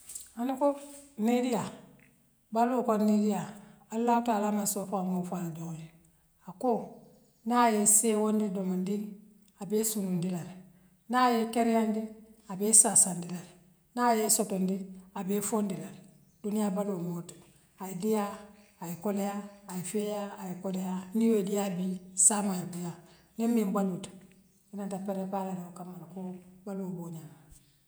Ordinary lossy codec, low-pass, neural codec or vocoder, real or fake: none; none; vocoder, 48 kHz, 128 mel bands, Vocos; fake